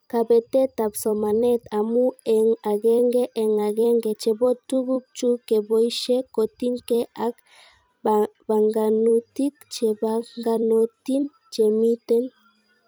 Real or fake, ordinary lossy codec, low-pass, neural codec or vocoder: fake; none; none; vocoder, 44.1 kHz, 128 mel bands every 256 samples, BigVGAN v2